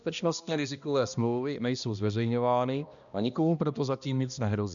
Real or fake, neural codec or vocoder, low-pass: fake; codec, 16 kHz, 1 kbps, X-Codec, HuBERT features, trained on balanced general audio; 7.2 kHz